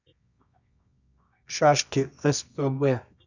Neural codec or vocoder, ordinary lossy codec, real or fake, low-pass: codec, 24 kHz, 0.9 kbps, WavTokenizer, medium music audio release; none; fake; 7.2 kHz